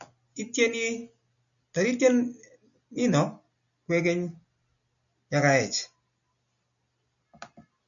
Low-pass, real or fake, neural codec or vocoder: 7.2 kHz; real; none